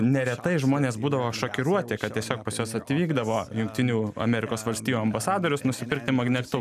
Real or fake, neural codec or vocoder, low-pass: real; none; 14.4 kHz